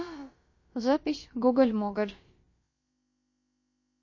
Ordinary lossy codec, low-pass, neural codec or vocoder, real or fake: MP3, 32 kbps; 7.2 kHz; codec, 16 kHz, about 1 kbps, DyCAST, with the encoder's durations; fake